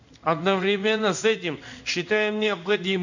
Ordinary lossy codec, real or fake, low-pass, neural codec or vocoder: none; fake; 7.2 kHz; codec, 16 kHz in and 24 kHz out, 1 kbps, XY-Tokenizer